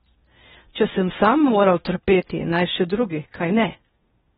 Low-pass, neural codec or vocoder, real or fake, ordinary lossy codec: 10.8 kHz; codec, 16 kHz in and 24 kHz out, 0.6 kbps, FocalCodec, streaming, 2048 codes; fake; AAC, 16 kbps